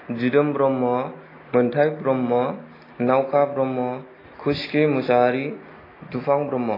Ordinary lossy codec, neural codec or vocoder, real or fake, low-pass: AAC, 24 kbps; none; real; 5.4 kHz